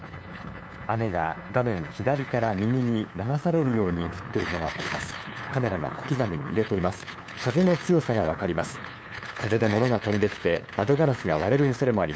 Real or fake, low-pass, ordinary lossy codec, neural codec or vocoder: fake; none; none; codec, 16 kHz, 2 kbps, FunCodec, trained on LibriTTS, 25 frames a second